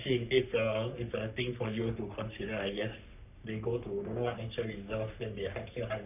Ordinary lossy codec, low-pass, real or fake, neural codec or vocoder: none; 3.6 kHz; fake; codec, 44.1 kHz, 3.4 kbps, Pupu-Codec